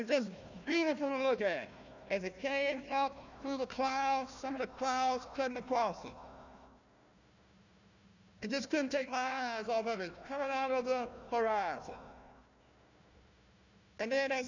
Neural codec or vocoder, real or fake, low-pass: codec, 16 kHz, 1 kbps, FunCodec, trained on Chinese and English, 50 frames a second; fake; 7.2 kHz